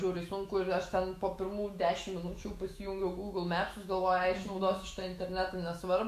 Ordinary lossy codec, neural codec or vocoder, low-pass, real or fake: AAC, 64 kbps; autoencoder, 48 kHz, 128 numbers a frame, DAC-VAE, trained on Japanese speech; 14.4 kHz; fake